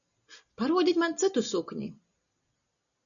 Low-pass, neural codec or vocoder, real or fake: 7.2 kHz; none; real